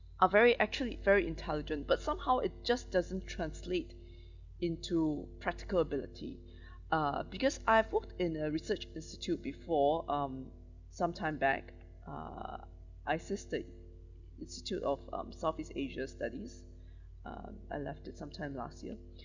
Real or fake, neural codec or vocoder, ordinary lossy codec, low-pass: real; none; Opus, 64 kbps; 7.2 kHz